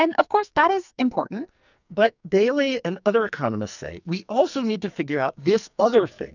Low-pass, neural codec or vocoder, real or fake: 7.2 kHz; codec, 44.1 kHz, 2.6 kbps, SNAC; fake